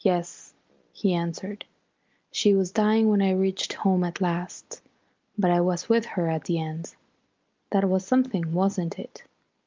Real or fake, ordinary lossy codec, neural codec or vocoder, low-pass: real; Opus, 32 kbps; none; 7.2 kHz